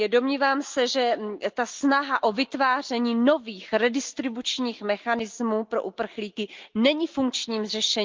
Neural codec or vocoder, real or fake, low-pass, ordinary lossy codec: none; real; 7.2 kHz; Opus, 24 kbps